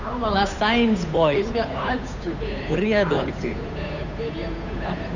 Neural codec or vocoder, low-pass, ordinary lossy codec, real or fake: codec, 16 kHz, 8 kbps, FunCodec, trained on Chinese and English, 25 frames a second; 7.2 kHz; none; fake